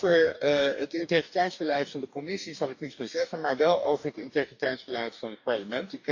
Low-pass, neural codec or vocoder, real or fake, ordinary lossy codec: 7.2 kHz; codec, 44.1 kHz, 2.6 kbps, DAC; fake; none